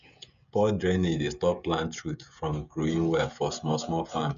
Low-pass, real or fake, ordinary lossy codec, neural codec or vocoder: 7.2 kHz; fake; none; codec, 16 kHz, 16 kbps, FreqCodec, smaller model